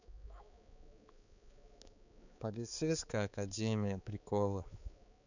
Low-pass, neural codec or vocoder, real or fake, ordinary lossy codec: 7.2 kHz; codec, 16 kHz, 4 kbps, X-Codec, HuBERT features, trained on balanced general audio; fake; none